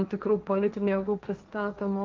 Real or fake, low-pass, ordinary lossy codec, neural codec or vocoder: fake; 7.2 kHz; Opus, 24 kbps; codec, 16 kHz, 1.1 kbps, Voila-Tokenizer